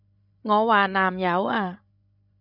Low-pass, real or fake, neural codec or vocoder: 5.4 kHz; fake; codec, 16 kHz, 16 kbps, FreqCodec, larger model